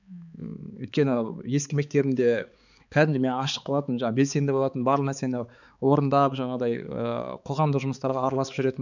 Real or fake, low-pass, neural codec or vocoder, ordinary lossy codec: fake; 7.2 kHz; codec, 16 kHz, 4 kbps, X-Codec, HuBERT features, trained on balanced general audio; none